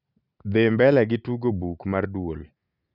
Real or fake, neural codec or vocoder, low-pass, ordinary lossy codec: real; none; 5.4 kHz; none